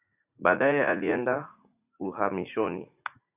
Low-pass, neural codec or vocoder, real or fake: 3.6 kHz; vocoder, 44.1 kHz, 80 mel bands, Vocos; fake